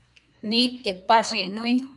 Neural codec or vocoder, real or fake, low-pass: codec, 24 kHz, 1 kbps, SNAC; fake; 10.8 kHz